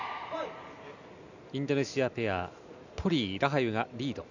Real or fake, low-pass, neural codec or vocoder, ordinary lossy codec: real; 7.2 kHz; none; none